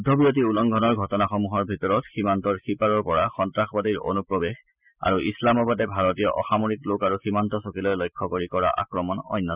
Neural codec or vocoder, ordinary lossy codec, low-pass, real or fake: none; Opus, 64 kbps; 3.6 kHz; real